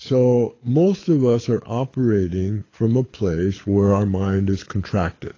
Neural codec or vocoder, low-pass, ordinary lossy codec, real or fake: codec, 24 kHz, 6 kbps, HILCodec; 7.2 kHz; AAC, 32 kbps; fake